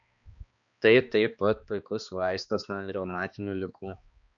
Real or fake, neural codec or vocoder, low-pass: fake; codec, 16 kHz, 2 kbps, X-Codec, HuBERT features, trained on balanced general audio; 7.2 kHz